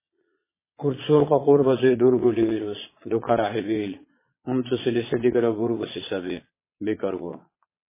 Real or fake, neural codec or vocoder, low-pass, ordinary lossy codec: fake; vocoder, 22.05 kHz, 80 mel bands, Vocos; 3.6 kHz; MP3, 16 kbps